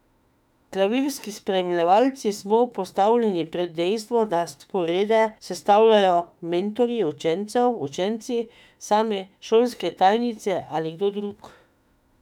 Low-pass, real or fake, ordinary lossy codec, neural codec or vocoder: 19.8 kHz; fake; none; autoencoder, 48 kHz, 32 numbers a frame, DAC-VAE, trained on Japanese speech